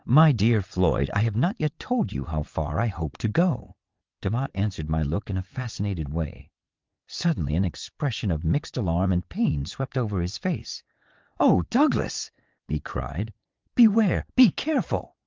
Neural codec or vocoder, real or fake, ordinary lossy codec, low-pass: vocoder, 22.05 kHz, 80 mel bands, WaveNeXt; fake; Opus, 32 kbps; 7.2 kHz